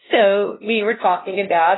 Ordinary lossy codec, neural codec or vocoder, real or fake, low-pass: AAC, 16 kbps; codec, 16 kHz, 0.5 kbps, FunCodec, trained on LibriTTS, 25 frames a second; fake; 7.2 kHz